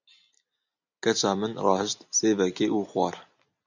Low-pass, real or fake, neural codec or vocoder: 7.2 kHz; real; none